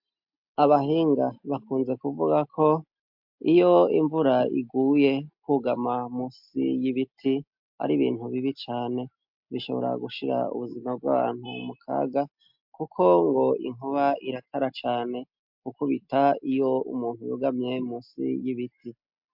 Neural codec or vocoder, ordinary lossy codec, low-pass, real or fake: none; MP3, 48 kbps; 5.4 kHz; real